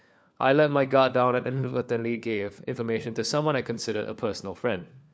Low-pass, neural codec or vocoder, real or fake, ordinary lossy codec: none; codec, 16 kHz, 4 kbps, FunCodec, trained on LibriTTS, 50 frames a second; fake; none